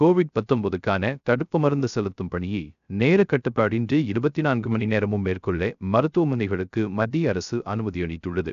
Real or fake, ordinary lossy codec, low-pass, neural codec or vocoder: fake; none; 7.2 kHz; codec, 16 kHz, 0.3 kbps, FocalCodec